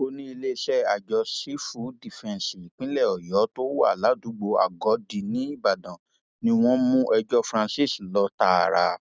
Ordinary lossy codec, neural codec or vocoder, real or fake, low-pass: none; none; real; none